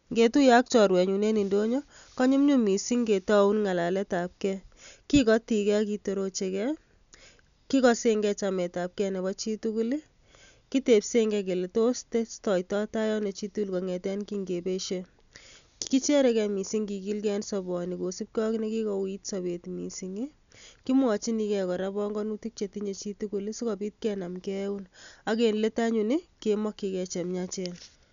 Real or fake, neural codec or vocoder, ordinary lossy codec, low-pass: real; none; none; 7.2 kHz